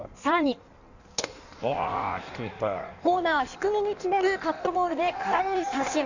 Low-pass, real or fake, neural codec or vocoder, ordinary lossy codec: 7.2 kHz; fake; codec, 16 kHz in and 24 kHz out, 1.1 kbps, FireRedTTS-2 codec; none